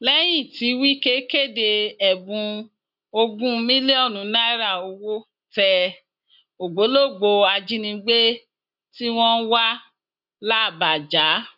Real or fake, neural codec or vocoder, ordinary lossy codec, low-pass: real; none; none; 5.4 kHz